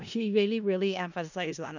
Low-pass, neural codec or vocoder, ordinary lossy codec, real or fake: 7.2 kHz; codec, 16 kHz in and 24 kHz out, 0.4 kbps, LongCat-Audio-Codec, four codebook decoder; none; fake